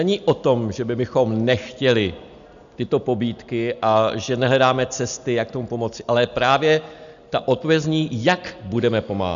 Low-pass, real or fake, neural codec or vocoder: 7.2 kHz; real; none